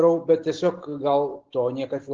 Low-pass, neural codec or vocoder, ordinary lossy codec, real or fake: 7.2 kHz; none; Opus, 16 kbps; real